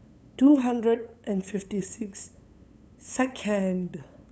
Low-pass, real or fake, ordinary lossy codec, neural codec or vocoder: none; fake; none; codec, 16 kHz, 8 kbps, FunCodec, trained on LibriTTS, 25 frames a second